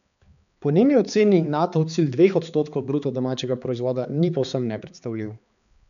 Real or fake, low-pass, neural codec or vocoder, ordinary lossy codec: fake; 7.2 kHz; codec, 16 kHz, 4 kbps, X-Codec, HuBERT features, trained on balanced general audio; none